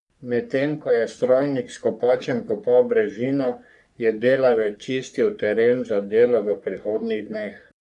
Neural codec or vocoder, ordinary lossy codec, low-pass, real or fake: codec, 44.1 kHz, 3.4 kbps, Pupu-Codec; none; 10.8 kHz; fake